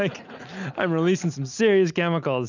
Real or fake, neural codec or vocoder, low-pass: real; none; 7.2 kHz